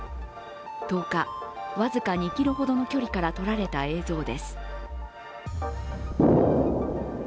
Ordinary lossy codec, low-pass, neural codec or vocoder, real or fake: none; none; none; real